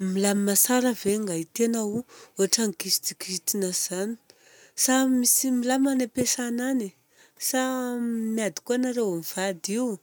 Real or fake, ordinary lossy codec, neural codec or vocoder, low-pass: real; none; none; none